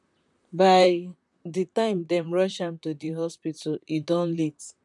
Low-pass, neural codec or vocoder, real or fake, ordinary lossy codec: 10.8 kHz; vocoder, 44.1 kHz, 128 mel bands, Pupu-Vocoder; fake; none